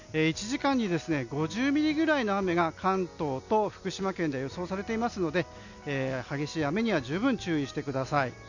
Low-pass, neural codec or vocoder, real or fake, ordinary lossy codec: 7.2 kHz; none; real; none